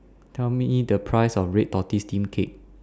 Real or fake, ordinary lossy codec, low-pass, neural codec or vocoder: real; none; none; none